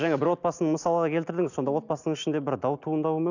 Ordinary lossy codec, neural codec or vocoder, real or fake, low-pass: none; none; real; 7.2 kHz